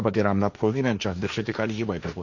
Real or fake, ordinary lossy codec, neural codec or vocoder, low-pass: fake; none; codec, 16 kHz, 1.1 kbps, Voila-Tokenizer; 7.2 kHz